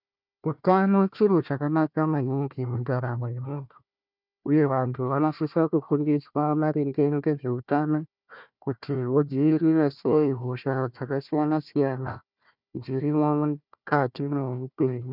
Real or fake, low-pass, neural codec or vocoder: fake; 5.4 kHz; codec, 16 kHz, 1 kbps, FunCodec, trained on Chinese and English, 50 frames a second